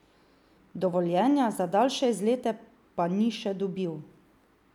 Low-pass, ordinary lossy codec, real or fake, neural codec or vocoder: 19.8 kHz; none; real; none